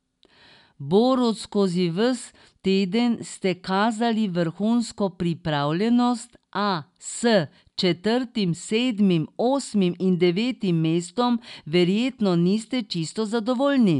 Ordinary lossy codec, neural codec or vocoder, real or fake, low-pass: none; none; real; 10.8 kHz